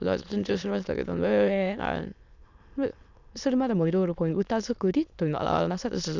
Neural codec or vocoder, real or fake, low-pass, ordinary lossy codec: autoencoder, 22.05 kHz, a latent of 192 numbers a frame, VITS, trained on many speakers; fake; 7.2 kHz; none